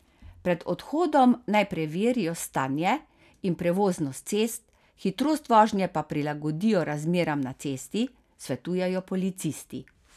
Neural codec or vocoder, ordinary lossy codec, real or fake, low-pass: none; none; real; 14.4 kHz